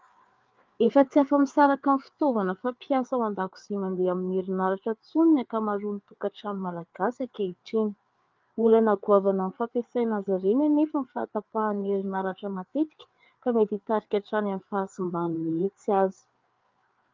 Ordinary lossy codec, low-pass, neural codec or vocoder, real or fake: Opus, 24 kbps; 7.2 kHz; codec, 16 kHz, 4 kbps, FreqCodec, larger model; fake